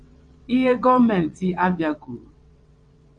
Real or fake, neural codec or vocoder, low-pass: fake; vocoder, 22.05 kHz, 80 mel bands, WaveNeXt; 9.9 kHz